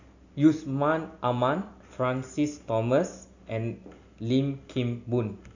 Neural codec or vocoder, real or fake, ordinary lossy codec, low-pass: none; real; none; 7.2 kHz